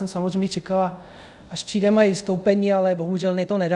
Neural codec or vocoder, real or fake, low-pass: codec, 24 kHz, 0.5 kbps, DualCodec; fake; 10.8 kHz